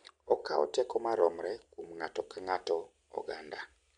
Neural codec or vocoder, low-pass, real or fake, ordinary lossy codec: none; 9.9 kHz; real; MP3, 64 kbps